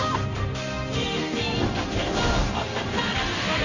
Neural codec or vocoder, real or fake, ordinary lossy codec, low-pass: codec, 16 kHz in and 24 kHz out, 1 kbps, XY-Tokenizer; fake; none; 7.2 kHz